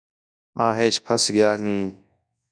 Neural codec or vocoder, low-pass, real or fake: codec, 24 kHz, 0.9 kbps, WavTokenizer, large speech release; 9.9 kHz; fake